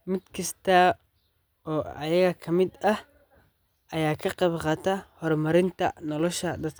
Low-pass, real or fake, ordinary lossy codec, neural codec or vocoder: none; real; none; none